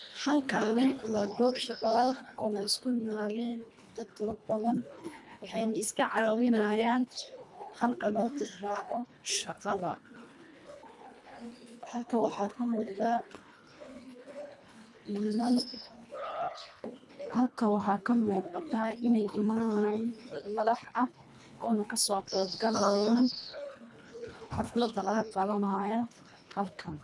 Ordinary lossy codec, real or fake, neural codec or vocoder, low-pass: none; fake; codec, 24 kHz, 1.5 kbps, HILCodec; none